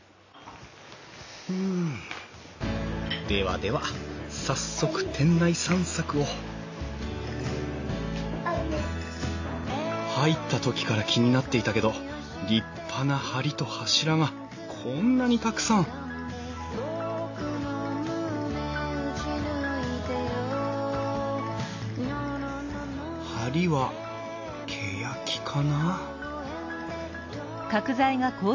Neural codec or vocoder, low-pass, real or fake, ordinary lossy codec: none; 7.2 kHz; real; AAC, 48 kbps